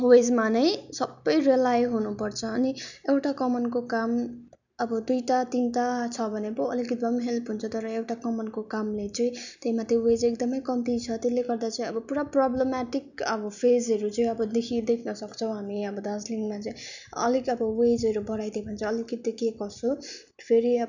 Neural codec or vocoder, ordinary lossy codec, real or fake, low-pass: none; none; real; 7.2 kHz